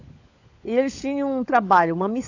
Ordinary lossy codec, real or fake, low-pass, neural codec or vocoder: AAC, 48 kbps; fake; 7.2 kHz; codec, 16 kHz, 16 kbps, FunCodec, trained on LibriTTS, 50 frames a second